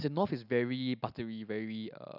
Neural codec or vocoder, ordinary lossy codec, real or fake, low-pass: none; none; real; 5.4 kHz